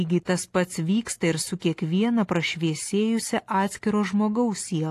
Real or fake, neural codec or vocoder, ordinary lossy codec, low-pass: real; none; AAC, 48 kbps; 14.4 kHz